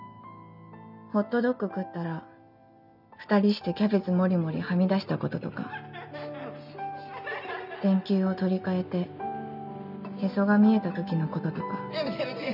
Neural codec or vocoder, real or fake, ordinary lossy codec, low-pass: none; real; none; 5.4 kHz